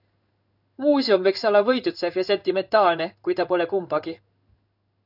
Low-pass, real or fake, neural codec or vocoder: 5.4 kHz; fake; codec, 16 kHz in and 24 kHz out, 1 kbps, XY-Tokenizer